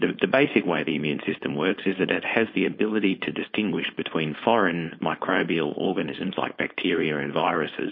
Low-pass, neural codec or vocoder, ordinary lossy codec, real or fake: 5.4 kHz; codec, 16 kHz, 4.8 kbps, FACodec; MP3, 32 kbps; fake